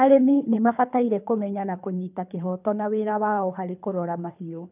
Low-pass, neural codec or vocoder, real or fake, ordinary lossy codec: 3.6 kHz; codec, 24 kHz, 3 kbps, HILCodec; fake; none